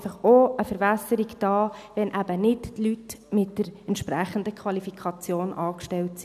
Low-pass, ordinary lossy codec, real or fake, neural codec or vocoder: 14.4 kHz; none; real; none